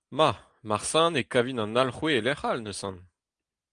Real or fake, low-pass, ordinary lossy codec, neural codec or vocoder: real; 9.9 kHz; Opus, 24 kbps; none